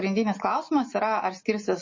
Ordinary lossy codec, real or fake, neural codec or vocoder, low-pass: MP3, 32 kbps; real; none; 7.2 kHz